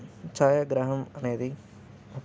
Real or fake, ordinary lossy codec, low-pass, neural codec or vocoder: real; none; none; none